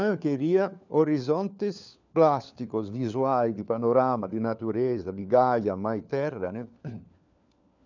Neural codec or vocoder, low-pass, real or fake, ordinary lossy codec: codec, 16 kHz, 4 kbps, FunCodec, trained on LibriTTS, 50 frames a second; 7.2 kHz; fake; none